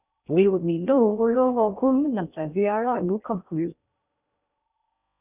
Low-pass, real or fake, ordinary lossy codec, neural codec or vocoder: 3.6 kHz; fake; none; codec, 16 kHz in and 24 kHz out, 0.6 kbps, FocalCodec, streaming, 2048 codes